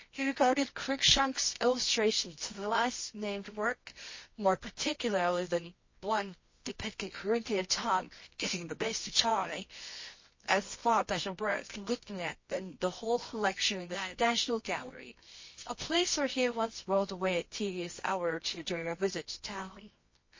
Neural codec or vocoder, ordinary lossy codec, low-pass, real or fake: codec, 24 kHz, 0.9 kbps, WavTokenizer, medium music audio release; MP3, 32 kbps; 7.2 kHz; fake